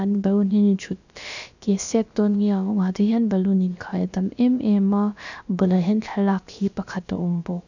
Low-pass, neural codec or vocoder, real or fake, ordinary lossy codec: 7.2 kHz; codec, 16 kHz, about 1 kbps, DyCAST, with the encoder's durations; fake; none